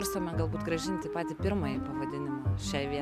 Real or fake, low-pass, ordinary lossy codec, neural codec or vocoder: real; 14.4 kHz; MP3, 96 kbps; none